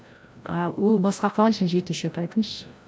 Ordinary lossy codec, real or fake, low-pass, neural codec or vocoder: none; fake; none; codec, 16 kHz, 0.5 kbps, FreqCodec, larger model